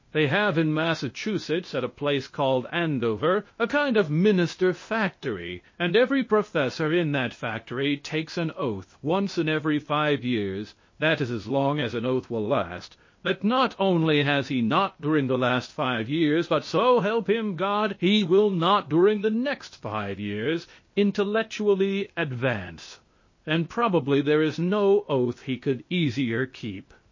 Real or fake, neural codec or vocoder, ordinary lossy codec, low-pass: fake; codec, 16 kHz, 0.8 kbps, ZipCodec; MP3, 32 kbps; 7.2 kHz